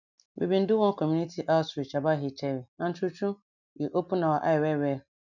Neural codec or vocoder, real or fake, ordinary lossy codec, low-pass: none; real; none; 7.2 kHz